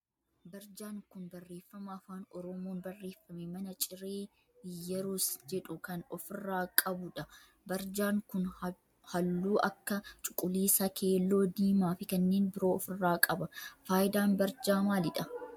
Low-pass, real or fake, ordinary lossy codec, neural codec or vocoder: 19.8 kHz; real; MP3, 96 kbps; none